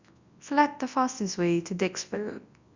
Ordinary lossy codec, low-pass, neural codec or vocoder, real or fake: Opus, 64 kbps; 7.2 kHz; codec, 24 kHz, 0.9 kbps, WavTokenizer, large speech release; fake